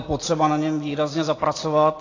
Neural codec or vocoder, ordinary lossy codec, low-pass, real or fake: none; AAC, 32 kbps; 7.2 kHz; real